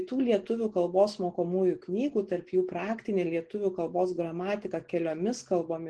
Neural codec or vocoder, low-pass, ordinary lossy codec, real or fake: none; 10.8 kHz; Opus, 16 kbps; real